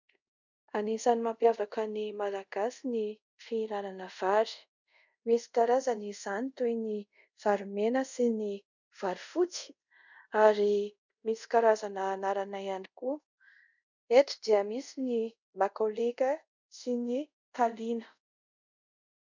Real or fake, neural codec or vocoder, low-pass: fake; codec, 24 kHz, 0.5 kbps, DualCodec; 7.2 kHz